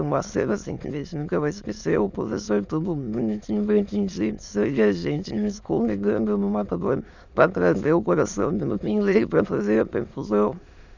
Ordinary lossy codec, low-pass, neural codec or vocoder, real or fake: none; 7.2 kHz; autoencoder, 22.05 kHz, a latent of 192 numbers a frame, VITS, trained on many speakers; fake